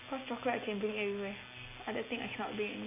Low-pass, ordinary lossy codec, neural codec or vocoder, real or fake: 3.6 kHz; AAC, 32 kbps; none; real